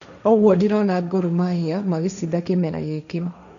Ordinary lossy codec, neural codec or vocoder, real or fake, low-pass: none; codec, 16 kHz, 1.1 kbps, Voila-Tokenizer; fake; 7.2 kHz